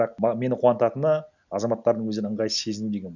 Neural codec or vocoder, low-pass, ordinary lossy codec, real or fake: none; 7.2 kHz; none; real